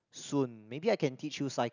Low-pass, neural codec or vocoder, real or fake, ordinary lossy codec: 7.2 kHz; none; real; none